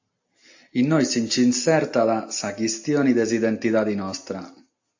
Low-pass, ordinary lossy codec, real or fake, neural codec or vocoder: 7.2 kHz; AAC, 48 kbps; real; none